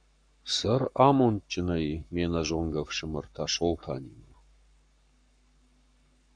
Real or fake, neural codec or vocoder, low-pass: fake; codec, 44.1 kHz, 7.8 kbps, Pupu-Codec; 9.9 kHz